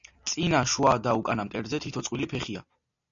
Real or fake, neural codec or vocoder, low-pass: real; none; 7.2 kHz